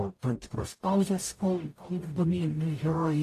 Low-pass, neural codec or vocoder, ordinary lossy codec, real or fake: 14.4 kHz; codec, 44.1 kHz, 0.9 kbps, DAC; AAC, 48 kbps; fake